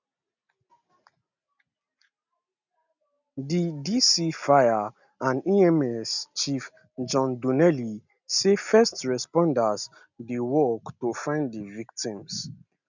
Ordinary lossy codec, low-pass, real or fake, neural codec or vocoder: none; 7.2 kHz; real; none